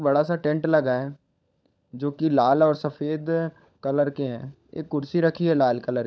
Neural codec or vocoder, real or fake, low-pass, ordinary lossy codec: codec, 16 kHz, 16 kbps, FunCodec, trained on LibriTTS, 50 frames a second; fake; none; none